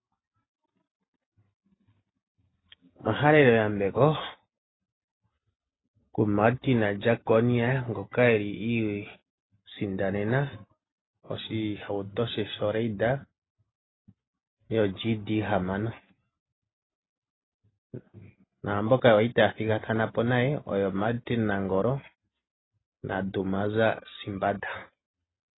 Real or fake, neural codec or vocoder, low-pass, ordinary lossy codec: real; none; 7.2 kHz; AAC, 16 kbps